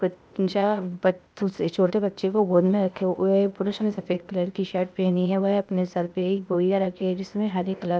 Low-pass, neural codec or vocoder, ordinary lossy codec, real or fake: none; codec, 16 kHz, 0.8 kbps, ZipCodec; none; fake